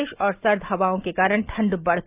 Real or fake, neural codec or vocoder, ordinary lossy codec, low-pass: real; none; Opus, 24 kbps; 3.6 kHz